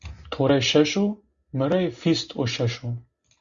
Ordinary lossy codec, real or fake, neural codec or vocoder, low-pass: Opus, 64 kbps; real; none; 7.2 kHz